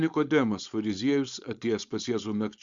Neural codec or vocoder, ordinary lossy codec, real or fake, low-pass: codec, 16 kHz, 4.8 kbps, FACodec; Opus, 64 kbps; fake; 7.2 kHz